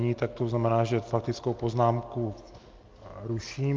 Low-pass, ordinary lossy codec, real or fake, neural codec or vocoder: 7.2 kHz; Opus, 24 kbps; real; none